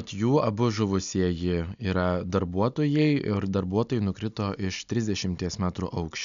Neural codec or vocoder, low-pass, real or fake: none; 7.2 kHz; real